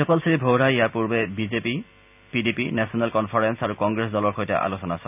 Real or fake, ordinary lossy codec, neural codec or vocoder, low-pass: real; none; none; 3.6 kHz